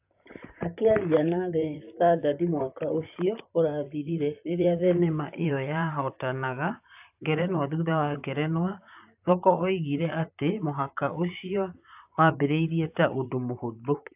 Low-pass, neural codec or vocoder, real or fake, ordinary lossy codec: 3.6 kHz; vocoder, 44.1 kHz, 128 mel bands, Pupu-Vocoder; fake; AAC, 32 kbps